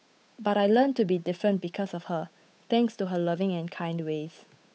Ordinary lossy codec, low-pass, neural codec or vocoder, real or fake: none; none; codec, 16 kHz, 8 kbps, FunCodec, trained on Chinese and English, 25 frames a second; fake